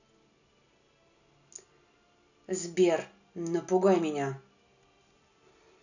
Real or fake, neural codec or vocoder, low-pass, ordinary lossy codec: real; none; 7.2 kHz; none